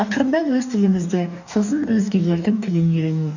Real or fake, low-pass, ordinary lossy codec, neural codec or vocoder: fake; 7.2 kHz; none; codec, 44.1 kHz, 2.6 kbps, DAC